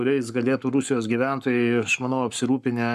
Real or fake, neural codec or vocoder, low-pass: fake; codec, 44.1 kHz, 7.8 kbps, Pupu-Codec; 14.4 kHz